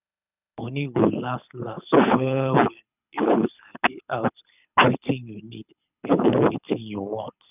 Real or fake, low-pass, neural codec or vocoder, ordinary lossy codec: fake; 3.6 kHz; codec, 24 kHz, 6 kbps, HILCodec; none